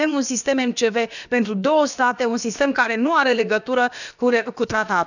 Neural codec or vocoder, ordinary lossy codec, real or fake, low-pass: codec, 16 kHz, about 1 kbps, DyCAST, with the encoder's durations; none; fake; 7.2 kHz